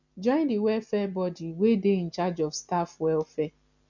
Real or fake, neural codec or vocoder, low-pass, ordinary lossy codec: real; none; 7.2 kHz; none